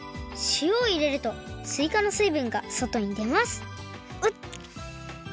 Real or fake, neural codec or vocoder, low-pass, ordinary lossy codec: real; none; none; none